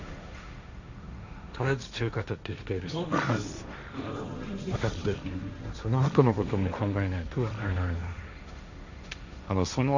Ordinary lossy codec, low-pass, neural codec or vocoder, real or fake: none; 7.2 kHz; codec, 16 kHz, 1.1 kbps, Voila-Tokenizer; fake